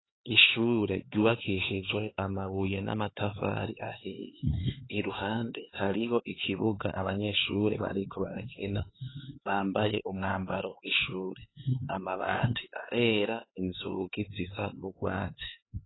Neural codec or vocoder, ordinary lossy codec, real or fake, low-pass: codec, 16 kHz, 4 kbps, X-Codec, HuBERT features, trained on LibriSpeech; AAC, 16 kbps; fake; 7.2 kHz